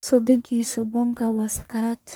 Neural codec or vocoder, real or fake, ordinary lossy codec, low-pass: codec, 44.1 kHz, 1.7 kbps, Pupu-Codec; fake; none; none